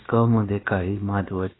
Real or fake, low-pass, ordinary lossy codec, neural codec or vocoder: fake; 7.2 kHz; AAC, 16 kbps; codec, 16 kHz, about 1 kbps, DyCAST, with the encoder's durations